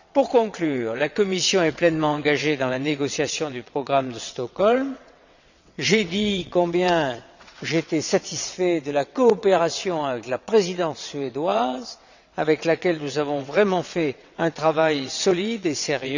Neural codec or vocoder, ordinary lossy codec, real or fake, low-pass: vocoder, 22.05 kHz, 80 mel bands, WaveNeXt; none; fake; 7.2 kHz